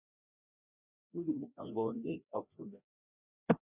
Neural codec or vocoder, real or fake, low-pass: codec, 16 kHz, 1 kbps, FreqCodec, larger model; fake; 3.6 kHz